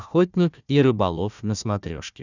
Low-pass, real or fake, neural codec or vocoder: 7.2 kHz; fake; codec, 16 kHz, 1 kbps, FunCodec, trained on Chinese and English, 50 frames a second